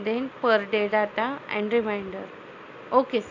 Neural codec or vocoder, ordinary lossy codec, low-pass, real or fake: none; AAC, 48 kbps; 7.2 kHz; real